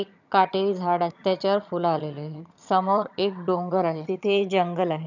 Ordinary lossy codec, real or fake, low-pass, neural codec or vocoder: none; fake; 7.2 kHz; vocoder, 22.05 kHz, 80 mel bands, HiFi-GAN